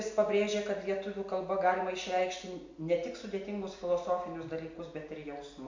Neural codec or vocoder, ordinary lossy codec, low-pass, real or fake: none; MP3, 48 kbps; 7.2 kHz; real